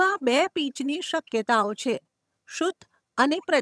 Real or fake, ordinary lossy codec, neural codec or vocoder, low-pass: fake; none; vocoder, 22.05 kHz, 80 mel bands, HiFi-GAN; none